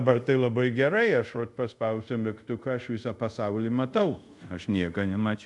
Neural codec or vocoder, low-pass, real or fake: codec, 24 kHz, 0.5 kbps, DualCodec; 10.8 kHz; fake